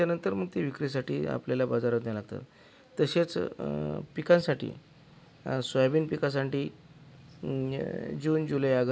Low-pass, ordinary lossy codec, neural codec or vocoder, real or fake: none; none; none; real